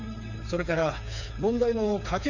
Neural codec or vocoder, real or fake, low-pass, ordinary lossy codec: codec, 16 kHz, 4 kbps, FreqCodec, smaller model; fake; 7.2 kHz; none